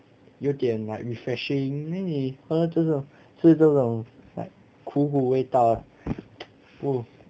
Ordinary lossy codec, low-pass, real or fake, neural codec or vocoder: none; none; real; none